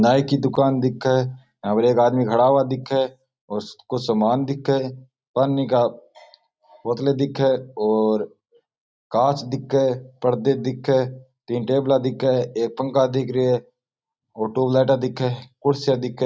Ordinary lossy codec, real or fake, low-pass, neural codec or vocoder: none; real; none; none